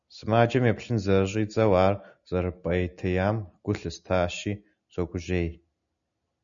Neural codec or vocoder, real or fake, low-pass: none; real; 7.2 kHz